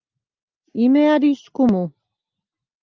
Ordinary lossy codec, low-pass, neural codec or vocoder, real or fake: Opus, 32 kbps; 7.2 kHz; none; real